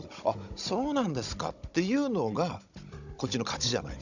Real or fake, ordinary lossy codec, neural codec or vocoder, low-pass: fake; none; codec, 16 kHz, 16 kbps, FunCodec, trained on Chinese and English, 50 frames a second; 7.2 kHz